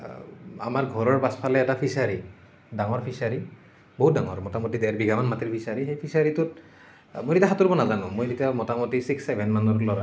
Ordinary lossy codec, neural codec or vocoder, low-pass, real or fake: none; none; none; real